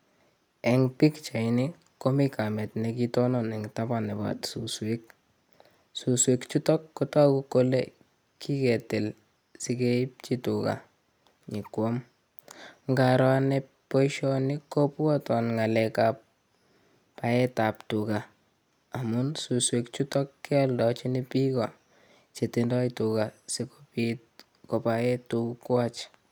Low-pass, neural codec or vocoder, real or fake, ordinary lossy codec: none; none; real; none